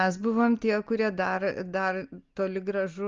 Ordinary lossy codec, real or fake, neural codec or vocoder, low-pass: Opus, 32 kbps; real; none; 7.2 kHz